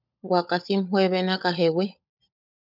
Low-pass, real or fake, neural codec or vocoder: 5.4 kHz; fake; codec, 16 kHz, 16 kbps, FunCodec, trained on LibriTTS, 50 frames a second